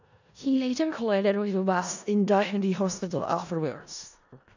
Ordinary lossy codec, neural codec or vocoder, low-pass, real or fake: none; codec, 16 kHz in and 24 kHz out, 0.4 kbps, LongCat-Audio-Codec, four codebook decoder; 7.2 kHz; fake